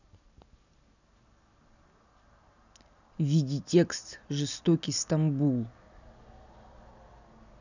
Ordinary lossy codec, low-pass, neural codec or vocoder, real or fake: none; 7.2 kHz; none; real